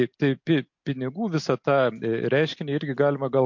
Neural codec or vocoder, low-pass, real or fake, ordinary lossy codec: none; 7.2 kHz; real; MP3, 48 kbps